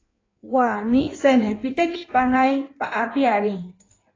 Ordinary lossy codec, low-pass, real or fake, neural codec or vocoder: AAC, 32 kbps; 7.2 kHz; fake; codec, 16 kHz in and 24 kHz out, 1.1 kbps, FireRedTTS-2 codec